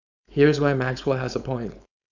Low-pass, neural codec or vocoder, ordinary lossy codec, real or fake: 7.2 kHz; codec, 16 kHz, 4.8 kbps, FACodec; none; fake